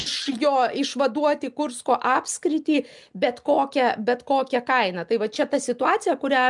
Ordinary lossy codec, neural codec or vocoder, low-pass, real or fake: MP3, 96 kbps; none; 10.8 kHz; real